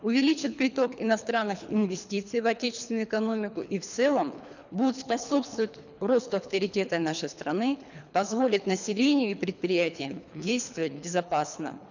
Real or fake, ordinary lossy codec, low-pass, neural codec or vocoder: fake; none; 7.2 kHz; codec, 24 kHz, 3 kbps, HILCodec